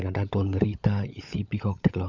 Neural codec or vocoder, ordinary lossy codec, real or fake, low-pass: codec, 16 kHz, 16 kbps, FunCodec, trained on LibriTTS, 50 frames a second; MP3, 64 kbps; fake; 7.2 kHz